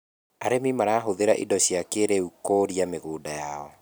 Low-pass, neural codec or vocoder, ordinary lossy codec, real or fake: none; none; none; real